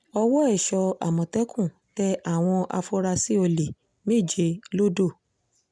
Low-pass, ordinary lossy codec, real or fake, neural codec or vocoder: 9.9 kHz; none; real; none